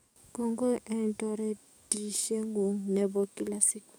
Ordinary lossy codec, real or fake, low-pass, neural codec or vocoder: none; fake; none; codec, 44.1 kHz, 7.8 kbps, DAC